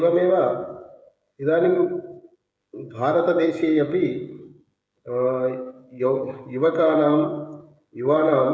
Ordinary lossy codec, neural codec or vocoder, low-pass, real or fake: none; codec, 16 kHz, 16 kbps, FreqCodec, smaller model; none; fake